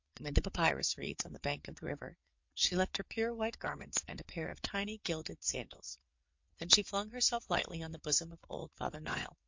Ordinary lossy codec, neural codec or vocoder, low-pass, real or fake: MP3, 48 kbps; vocoder, 22.05 kHz, 80 mel bands, WaveNeXt; 7.2 kHz; fake